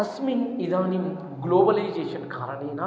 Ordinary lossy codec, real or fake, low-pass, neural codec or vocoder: none; real; none; none